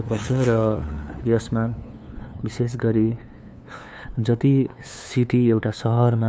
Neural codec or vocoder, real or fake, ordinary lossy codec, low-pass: codec, 16 kHz, 2 kbps, FunCodec, trained on LibriTTS, 25 frames a second; fake; none; none